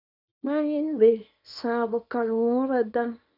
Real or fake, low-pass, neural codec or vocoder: fake; 5.4 kHz; codec, 24 kHz, 0.9 kbps, WavTokenizer, small release